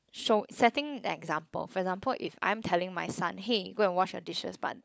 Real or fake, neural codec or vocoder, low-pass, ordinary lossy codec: fake; codec, 16 kHz, 16 kbps, FunCodec, trained on LibriTTS, 50 frames a second; none; none